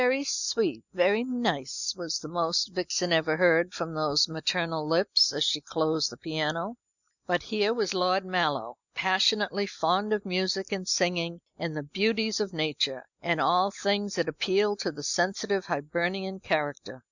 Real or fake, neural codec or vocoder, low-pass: real; none; 7.2 kHz